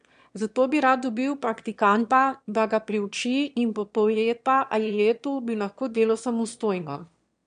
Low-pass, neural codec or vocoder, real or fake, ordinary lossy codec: 9.9 kHz; autoencoder, 22.05 kHz, a latent of 192 numbers a frame, VITS, trained on one speaker; fake; MP3, 64 kbps